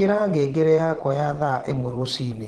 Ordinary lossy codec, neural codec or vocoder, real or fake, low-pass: Opus, 16 kbps; vocoder, 22.05 kHz, 80 mel bands, Vocos; fake; 9.9 kHz